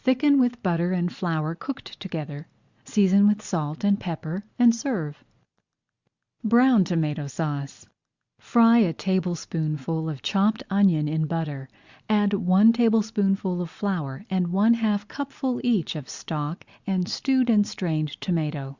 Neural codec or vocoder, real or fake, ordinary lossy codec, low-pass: none; real; Opus, 64 kbps; 7.2 kHz